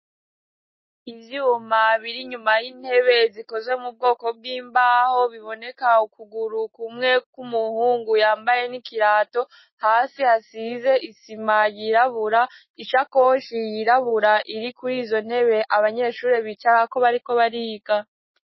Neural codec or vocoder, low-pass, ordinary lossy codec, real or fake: none; 7.2 kHz; MP3, 24 kbps; real